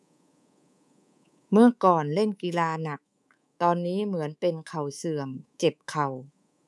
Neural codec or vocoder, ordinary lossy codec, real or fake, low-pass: codec, 24 kHz, 3.1 kbps, DualCodec; none; fake; none